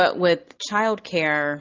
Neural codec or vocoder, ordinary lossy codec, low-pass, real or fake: none; Opus, 32 kbps; 7.2 kHz; real